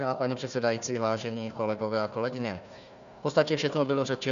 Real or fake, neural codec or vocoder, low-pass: fake; codec, 16 kHz, 1 kbps, FunCodec, trained on Chinese and English, 50 frames a second; 7.2 kHz